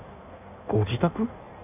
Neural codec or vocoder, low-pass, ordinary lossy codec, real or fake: codec, 16 kHz in and 24 kHz out, 1.1 kbps, FireRedTTS-2 codec; 3.6 kHz; none; fake